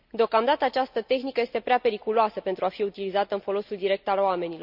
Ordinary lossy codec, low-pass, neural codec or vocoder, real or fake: none; 5.4 kHz; none; real